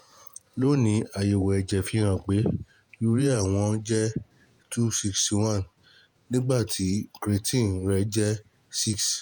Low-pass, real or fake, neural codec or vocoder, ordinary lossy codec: none; fake; vocoder, 48 kHz, 128 mel bands, Vocos; none